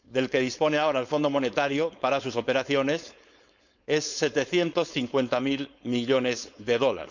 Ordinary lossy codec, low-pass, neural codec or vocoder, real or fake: none; 7.2 kHz; codec, 16 kHz, 4.8 kbps, FACodec; fake